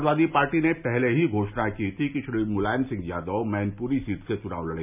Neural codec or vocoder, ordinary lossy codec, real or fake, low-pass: none; none; real; 3.6 kHz